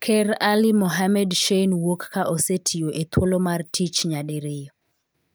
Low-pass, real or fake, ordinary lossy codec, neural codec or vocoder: none; real; none; none